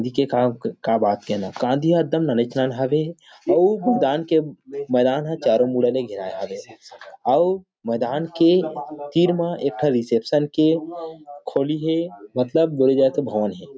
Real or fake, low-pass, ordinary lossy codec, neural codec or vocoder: real; none; none; none